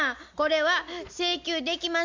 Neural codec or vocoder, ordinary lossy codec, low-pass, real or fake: none; MP3, 64 kbps; 7.2 kHz; real